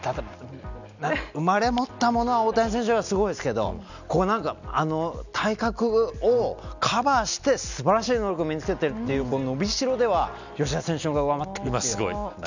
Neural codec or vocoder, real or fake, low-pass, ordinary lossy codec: none; real; 7.2 kHz; none